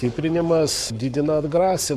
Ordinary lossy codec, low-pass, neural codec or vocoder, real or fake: MP3, 96 kbps; 14.4 kHz; codec, 44.1 kHz, 7.8 kbps, Pupu-Codec; fake